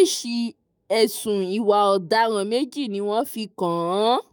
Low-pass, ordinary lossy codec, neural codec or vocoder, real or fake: none; none; autoencoder, 48 kHz, 128 numbers a frame, DAC-VAE, trained on Japanese speech; fake